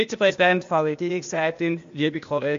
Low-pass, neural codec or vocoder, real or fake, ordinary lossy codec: 7.2 kHz; codec, 16 kHz, 0.8 kbps, ZipCodec; fake; MP3, 48 kbps